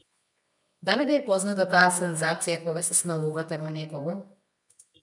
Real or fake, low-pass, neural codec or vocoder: fake; 10.8 kHz; codec, 24 kHz, 0.9 kbps, WavTokenizer, medium music audio release